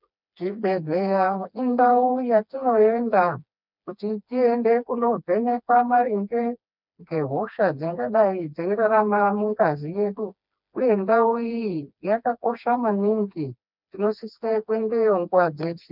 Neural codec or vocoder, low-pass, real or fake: codec, 16 kHz, 2 kbps, FreqCodec, smaller model; 5.4 kHz; fake